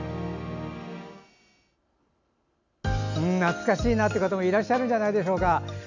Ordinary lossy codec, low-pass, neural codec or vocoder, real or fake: none; 7.2 kHz; none; real